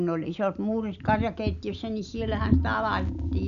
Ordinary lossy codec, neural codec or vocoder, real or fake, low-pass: none; none; real; 7.2 kHz